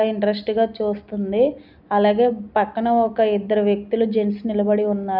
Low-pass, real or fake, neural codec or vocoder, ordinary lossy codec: 5.4 kHz; real; none; none